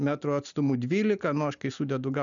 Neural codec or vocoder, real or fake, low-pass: none; real; 7.2 kHz